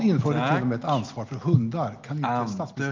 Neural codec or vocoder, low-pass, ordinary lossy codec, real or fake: none; 7.2 kHz; Opus, 32 kbps; real